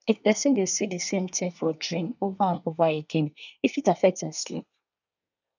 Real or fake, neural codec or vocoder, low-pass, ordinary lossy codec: fake; codec, 24 kHz, 1 kbps, SNAC; 7.2 kHz; none